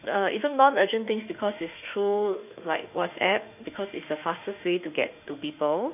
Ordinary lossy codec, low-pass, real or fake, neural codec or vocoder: none; 3.6 kHz; fake; autoencoder, 48 kHz, 32 numbers a frame, DAC-VAE, trained on Japanese speech